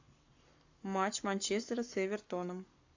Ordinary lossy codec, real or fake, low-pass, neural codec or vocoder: AAC, 48 kbps; fake; 7.2 kHz; codec, 44.1 kHz, 7.8 kbps, Pupu-Codec